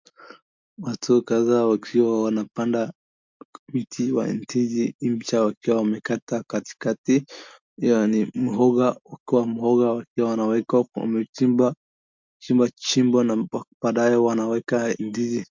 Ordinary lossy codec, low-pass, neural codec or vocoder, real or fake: MP3, 64 kbps; 7.2 kHz; none; real